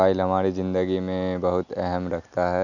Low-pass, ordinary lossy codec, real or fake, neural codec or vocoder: 7.2 kHz; none; real; none